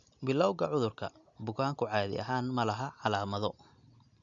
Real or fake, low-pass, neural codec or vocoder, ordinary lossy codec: real; 7.2 kHz; none; none